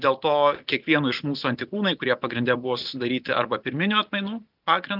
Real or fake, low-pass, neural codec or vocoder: real; 5.4 kHz; none